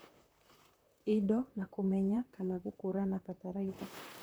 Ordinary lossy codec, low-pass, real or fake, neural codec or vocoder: none; none; fake; vocoder, 44.1 kHz, 128 mel bands, Pupu-Vocoder